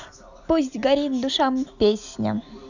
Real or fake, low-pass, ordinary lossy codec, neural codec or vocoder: real; 7.2 kHz; none; none